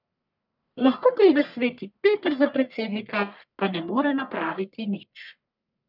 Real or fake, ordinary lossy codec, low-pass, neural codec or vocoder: fake; none; 5.4 kHz; codec, 44.1 kHz, 1.7 kbps, Pupu-Codec